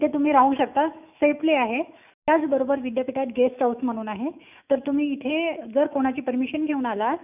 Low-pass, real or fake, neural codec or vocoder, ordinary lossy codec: 3.6 kHz; fake; autoencoder, 48 kHz, 128 numbers a frame, DAC-VAE, trained on Japanese speech; none